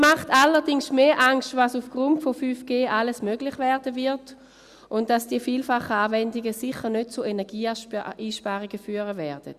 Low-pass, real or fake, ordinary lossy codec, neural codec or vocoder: 14.4 kHz; real; Opus, 64 kbps; none